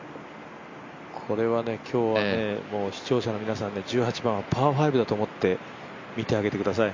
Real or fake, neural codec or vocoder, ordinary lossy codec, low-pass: real; none; MP3, 64 kbps; 7.2 kHz